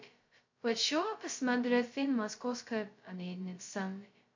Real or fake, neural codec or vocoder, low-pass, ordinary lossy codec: fake; codec, 16 kHz, 0.2 kbps, FocalCodec; 7.2 kHz; MP3, 48 kbps